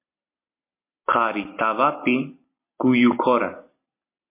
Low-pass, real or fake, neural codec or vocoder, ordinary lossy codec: 3.6 kHz; real; none; MP3, 24 kbps